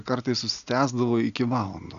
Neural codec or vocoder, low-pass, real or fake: none; 7.2 kHz; real